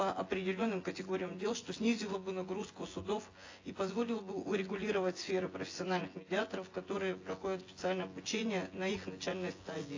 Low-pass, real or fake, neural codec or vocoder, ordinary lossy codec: 7.2 kHz; fake; vocoder, 24 kHz, 100 mel bands, Vocos; AAC, 32 kbps